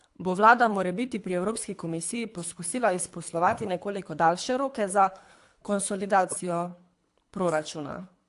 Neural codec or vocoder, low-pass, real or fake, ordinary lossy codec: codec, 24 kHz, 3 kbps, HILCodec; 10.8 kHz; fake; AAC, 64 kbps